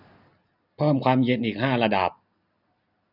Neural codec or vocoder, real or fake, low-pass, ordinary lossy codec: none; real; 5.4 kHz; none